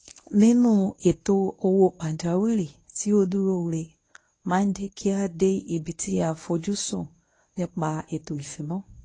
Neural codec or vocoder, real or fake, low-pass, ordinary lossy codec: codec, 24 kHz, 0.9 kbps, WavTokenizer, medium speech release version 1; fake; 10.8 kHz; AAC, 32 kbps